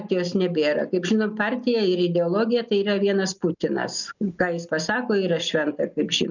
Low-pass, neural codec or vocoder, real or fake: 7.2 kHz; none; real